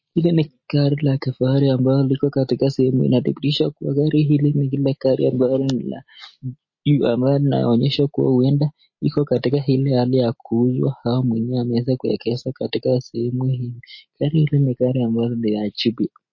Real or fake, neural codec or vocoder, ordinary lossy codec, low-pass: real; none; MP3, 32 kbps; 7.2 kHz